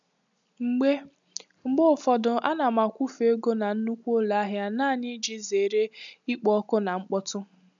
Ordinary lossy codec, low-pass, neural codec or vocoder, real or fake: none; 7.2 kHz; none; real